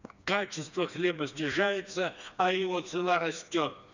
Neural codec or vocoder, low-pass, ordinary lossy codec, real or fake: codec, 16 kHz, 2 kbps, FreqCodec, smaller model; 7.2 kHz; none; fake